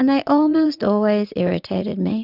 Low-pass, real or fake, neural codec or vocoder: 5.4 kHz; fake; vocoder, 44.1 kHz, 80 mel bands, Vocos